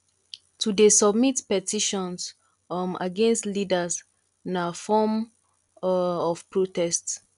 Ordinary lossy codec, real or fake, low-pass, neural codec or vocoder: none; real; 10.8 kHz; none